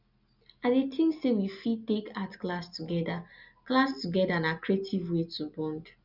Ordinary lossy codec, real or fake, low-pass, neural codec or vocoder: none; real; 5.4 kHz; none